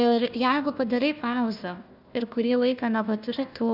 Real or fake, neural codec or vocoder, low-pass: fake; codec, 16 kHz, 1 kbps, FunCodec, trained on Chinese and English, 50 frames a second; 5.4 kHz